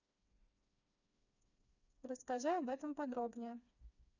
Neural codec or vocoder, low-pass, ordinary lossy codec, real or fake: codec, 16 kHz, 2 kbps, FreqCodec, smaller model; 7.2 kHz; none; fake